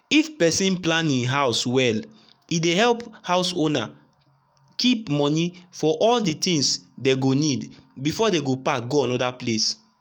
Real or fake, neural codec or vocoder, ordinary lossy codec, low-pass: fake; codec, 44.1 kHz, 7.8 kbps, DAC; none; 19.8 kHz